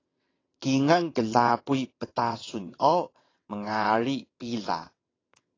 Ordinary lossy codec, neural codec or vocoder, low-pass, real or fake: AAC, 32 kbps; vocoder, 22.05 kHz, 80 mel bands, WaveNeXt; 7.2 kHz; fake